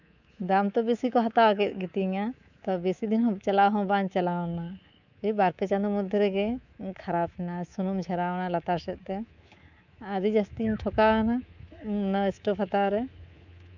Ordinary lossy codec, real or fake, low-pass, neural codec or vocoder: none; fake; 7.2 kHz; codec, 24 kHz, 3.1 kbps, DualCodec